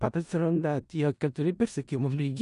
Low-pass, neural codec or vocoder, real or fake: 10.8 kHz; codec, 16 kHz in and 24 kHz out, 0.4 kbps, LongCat-Audio-Codec, four codebook decoder; fake